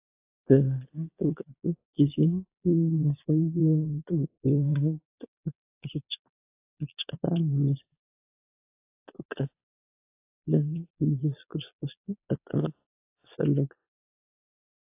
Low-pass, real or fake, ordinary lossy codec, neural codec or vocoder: 3.6 kHz; fake; AAC, 32 kbps; vocoder, 22.05 kHz, 80 mel bands, Vocos